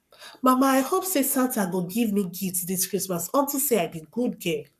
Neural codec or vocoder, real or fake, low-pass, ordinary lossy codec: codec, 44.1 kHz, 7.8 kbps, Pupu-Codec; fake; 14.4 kHz; none